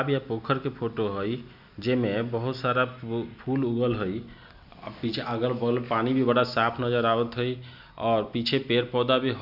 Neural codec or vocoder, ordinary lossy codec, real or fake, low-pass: none; none; real; 5.4 kHz